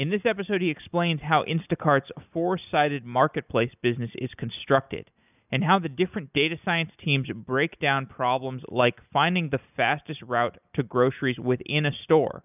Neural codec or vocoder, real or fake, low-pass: none; real; 3.6 kHz